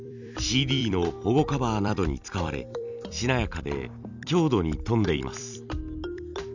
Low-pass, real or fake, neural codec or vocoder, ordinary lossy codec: 7.2 kHz; fake; vocoder, 44.1 kHz, 128 mel bands every 256 samples, BigVGAN v2; none